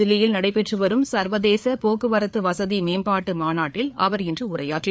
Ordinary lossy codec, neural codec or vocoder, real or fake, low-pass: none; codec, 16 kHz, 4 kbps, FreqCodec, larger model; fake; none